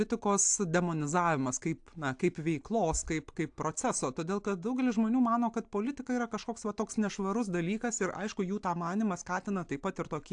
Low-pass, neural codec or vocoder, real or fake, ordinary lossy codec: 9.9 kHz; none; real; AAC, 64 kbps